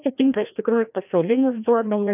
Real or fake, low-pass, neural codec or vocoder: fake; 3.6 kHz; codec, 16 kHz, 1 kbps, FreqCodec, larger model